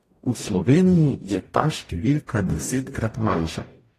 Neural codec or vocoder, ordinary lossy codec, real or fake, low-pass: codec, 44.1 kHz, 0.9 kbps, DAC; AAC, 48 kbps; fake; 14.4 kHz